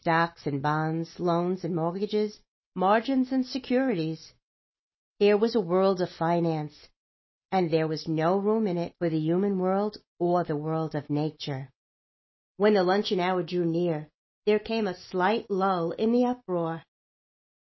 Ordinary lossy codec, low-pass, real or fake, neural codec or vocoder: MP3, 24 kbps; 7.2 kHz; real; none